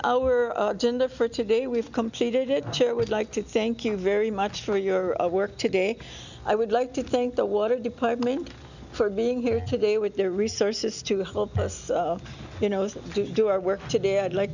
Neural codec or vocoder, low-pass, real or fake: none; 7.2 kHz; real